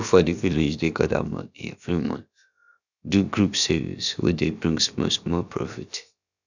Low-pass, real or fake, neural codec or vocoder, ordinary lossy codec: 7.2 kHz; fake; codec, 16 kHz, about 1 kbps, DyCAST, with the encoder's durations; none